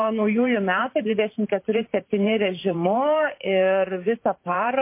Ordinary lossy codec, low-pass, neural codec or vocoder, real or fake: MP3, 24 kbps; 3.6 kHz; vocoder, 44.1 kHz, 128 mel bands every 512 samples, BigVGAN v2; fake